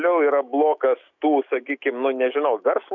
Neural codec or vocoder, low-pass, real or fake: autoencoder, 48 kHz, 128 numbers a frame, DAC-VAE, trained on Japanese speech; 7.2 kHz; fake